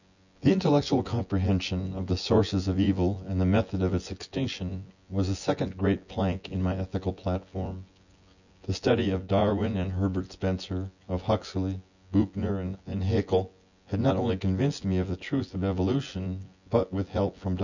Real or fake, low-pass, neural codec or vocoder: fake; 7.2 kHz; vocoder, 24 kHz, 100 mel bands, Vocos